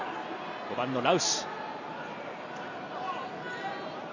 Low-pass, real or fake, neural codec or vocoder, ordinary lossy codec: 7.2 kHz; real; none; none